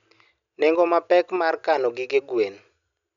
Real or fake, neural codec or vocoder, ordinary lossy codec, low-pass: real; none; none; 7.2 kHz